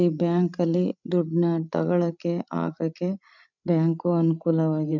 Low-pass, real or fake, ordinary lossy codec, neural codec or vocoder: 7.2 kHz; fake; none; codec, 16 kHz, 16 kbps, FreqCodec, larger model